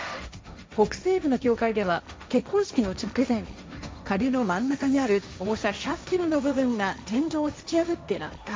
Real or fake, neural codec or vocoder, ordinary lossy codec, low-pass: fake; codec, 16 kHz, 1.1 kbps, Voila-Tokenizer; none; none